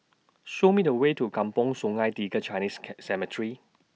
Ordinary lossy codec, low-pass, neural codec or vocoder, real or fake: none; none; none; real